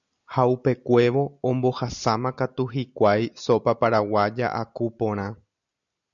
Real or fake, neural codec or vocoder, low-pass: real; none; 7.2 kHz